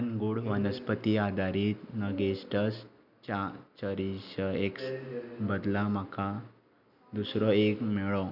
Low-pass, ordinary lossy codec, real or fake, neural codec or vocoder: 5.4 kHz; none; real; none